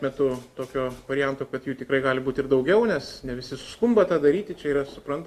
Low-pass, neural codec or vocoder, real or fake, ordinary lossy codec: 14.4 kHz; none; real; Opus, 64 kbps